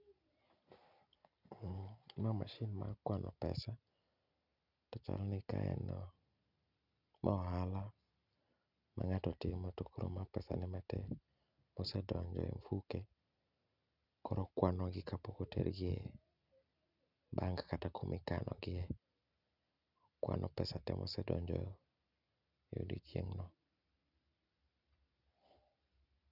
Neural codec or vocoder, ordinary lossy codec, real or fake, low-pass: none; none; real; 5.4 kHz